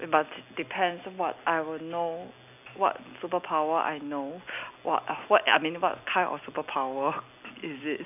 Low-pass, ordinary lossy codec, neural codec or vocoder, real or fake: 3.6 kHz; none; none; real